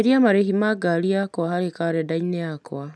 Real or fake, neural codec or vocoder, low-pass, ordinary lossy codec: real; none; none; none